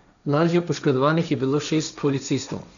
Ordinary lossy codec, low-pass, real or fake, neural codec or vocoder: none; 7.2 kHz; fake; codec, 16 kHz, 1.1 kbps, Voila-Tokenizer